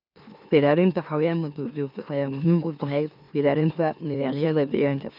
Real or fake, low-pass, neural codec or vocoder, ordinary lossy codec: fake; 5.4 kHz; autoencoder, 44.1 kHz, a latent of 192 numbers a frame, MeloTTS; none